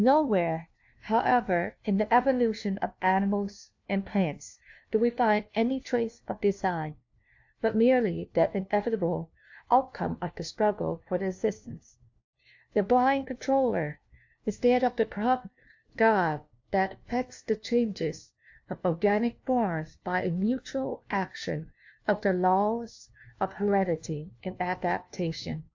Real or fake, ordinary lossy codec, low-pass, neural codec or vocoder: fake; AAC, 48 kbps; 7.2 kHz; codec, 16 kHz, 1 kbps, FunCodec, trained on LibriTTS, 50 frames a second